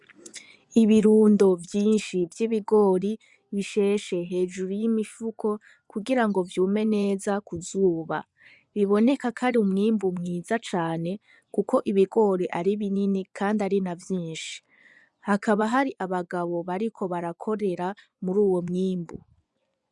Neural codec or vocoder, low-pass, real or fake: vocoder, 24 kHz, 100 mel bands, Vocos; 10.8 kHz; fake